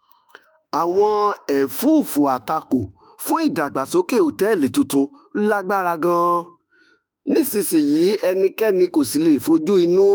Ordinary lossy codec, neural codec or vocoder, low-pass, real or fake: none; autoencoder, 48 kHz, 32 numbers a frame, DAC-VAE, trained on Japanese speech; none; fake